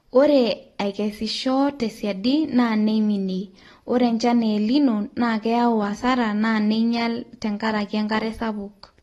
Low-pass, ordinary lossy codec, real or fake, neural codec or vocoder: 19.8 kHz; AAC, 32 kbps; real; none